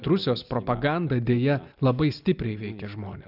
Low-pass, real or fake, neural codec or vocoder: 5.4 kHz; real; none